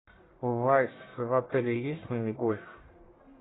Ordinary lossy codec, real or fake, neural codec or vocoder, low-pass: AAC, 16 kbps; fake; codec, 44.1 kHz, 1.7 kbps, Pupu-Codec; 7.2 kHz